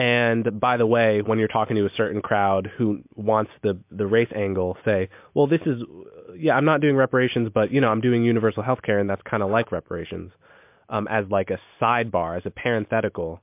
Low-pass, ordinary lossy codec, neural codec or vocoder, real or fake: 3.6 kHz; MP3, 32 kbps; none; real